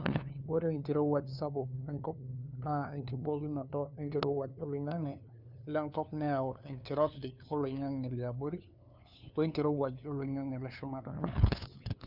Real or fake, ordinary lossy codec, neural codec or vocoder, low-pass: fake; none; codec, 16 kHz, 2 kbps, FunCodec, trained on LibriTTS, 25 frames a second; 5.4 kHz